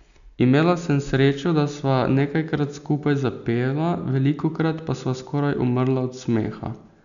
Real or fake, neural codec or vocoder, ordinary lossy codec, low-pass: real; none; MP3, 96 kbps; 7.2 kHz